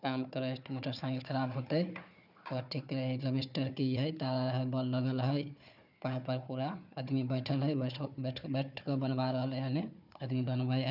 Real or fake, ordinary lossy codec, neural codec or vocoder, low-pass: fake; none; codec, 16 kHz, 4 kbps, FunCodec, trained on Chinese and English, 50 frames a second; 5.4 kHz